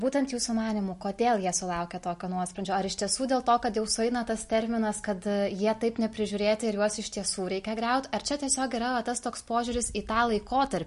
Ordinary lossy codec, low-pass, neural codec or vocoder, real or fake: MP3, 48 kbps; 14.4 kHz; none; real